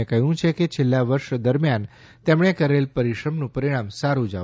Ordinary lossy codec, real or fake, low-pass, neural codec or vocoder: none; real; none; none